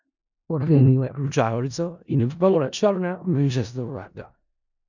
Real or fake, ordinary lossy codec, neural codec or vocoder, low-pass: fake; none; codec, 16 kHz in and 24 kHz out, 0.4 kbps, LongCat-Audio-Codec, four codebook decoder; 7.2 kHz